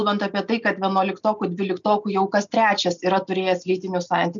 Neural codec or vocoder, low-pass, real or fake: none; 7.2 kHz; real